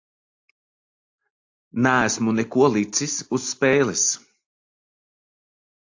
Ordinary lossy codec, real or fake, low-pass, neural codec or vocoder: AAC, 48 kbps; real; 7.2 kHz; none